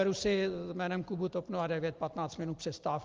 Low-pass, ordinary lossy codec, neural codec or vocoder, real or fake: 7.2 kHz; Opus, 32 kbps; none; real